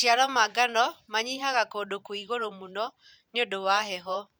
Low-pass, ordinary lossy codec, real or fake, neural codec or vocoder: none; none; fake; vocoder, 44.1 kHz, 128 mel bands every 512 samples, BigVGAN v2